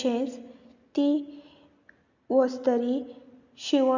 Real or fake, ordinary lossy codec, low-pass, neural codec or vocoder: real; Opus, 64 kbps; 7.2 kHz; none